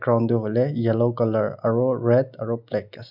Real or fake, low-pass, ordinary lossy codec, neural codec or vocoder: real; 5.4 kHz; none; none